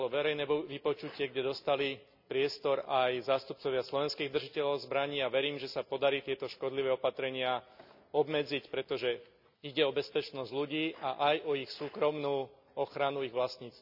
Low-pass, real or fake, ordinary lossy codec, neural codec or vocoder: 5.4 kHz; real; none; none